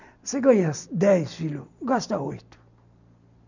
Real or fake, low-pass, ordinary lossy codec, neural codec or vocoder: real; 7.2 kHz; none; none